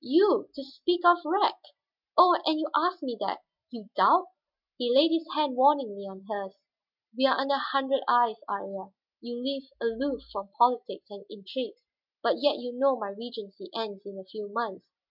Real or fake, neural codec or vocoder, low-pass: real; none; 5.4 kHz